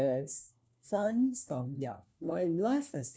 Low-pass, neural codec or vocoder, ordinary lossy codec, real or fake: none; codec, 16 kHz, 1 kbps, FunCodec, trained on LibriTTS, 50 frames a second; none; fake